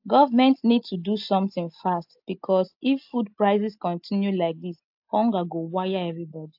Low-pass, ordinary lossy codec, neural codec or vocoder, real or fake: 5.4 kHz; AAC, 48 kbps; none; real